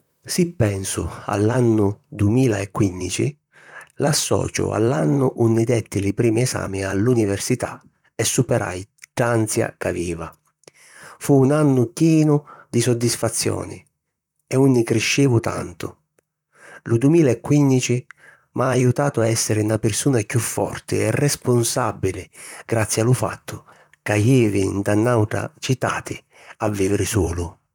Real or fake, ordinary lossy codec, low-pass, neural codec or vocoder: fake; none; 19.8 kHz; vocoder, 44.1 kHz, 128 mel bands, Pupu-Vocoder